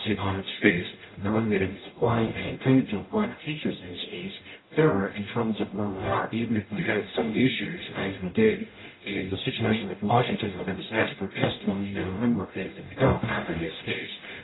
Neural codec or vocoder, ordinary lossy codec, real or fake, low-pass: codec, 44.1 kHz, 0.9 kbps, DAC; AAC, 16 kbps; fake; 7.2 kHz